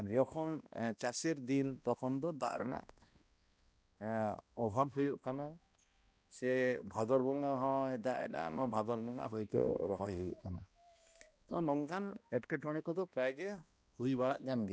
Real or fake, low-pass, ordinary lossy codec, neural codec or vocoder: fake; none; none; codec, 16 kHz, 1 kbps, X-Codec, HuBERT features, trained on balanced general audio